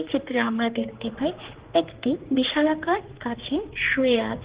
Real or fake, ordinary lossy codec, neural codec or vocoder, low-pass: fake; Opus, 16 kbps; codec, 16 kHz, 2 kbps, X-Codec, HuBERT features, trained on general audio; 3.6 kHz